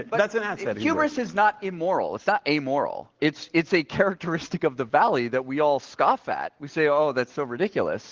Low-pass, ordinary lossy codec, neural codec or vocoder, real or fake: 7.2 kHz; Opus, 16 kbps; none; real